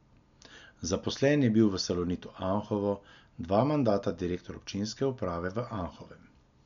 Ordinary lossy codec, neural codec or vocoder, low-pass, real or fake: MP3, 96 kbps; none; 7.2 kHz; real